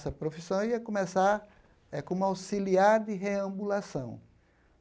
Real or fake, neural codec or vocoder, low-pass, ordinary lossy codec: real; none; none; none